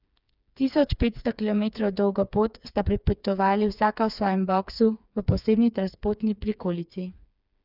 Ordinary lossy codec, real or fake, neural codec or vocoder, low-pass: none; fake; codec, 16 kHz, 4 kbps, FreqCodec, smaller model; 5.4 kHz